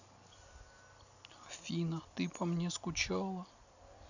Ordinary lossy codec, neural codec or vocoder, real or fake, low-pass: none; none; real; 7.2 kHz